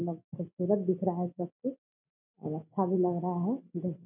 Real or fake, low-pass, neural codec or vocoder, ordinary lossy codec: real; 3.6 kHz; none; none